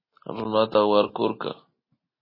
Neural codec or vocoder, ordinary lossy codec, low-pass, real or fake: none; MP3, 24 kbps; 5.4 kHz; real